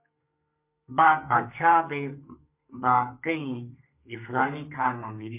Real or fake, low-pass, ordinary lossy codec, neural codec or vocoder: fake; 3.6 kHz; MP3, 32 kbps; codec, 32 kHz, 1.9 kbps, SNAC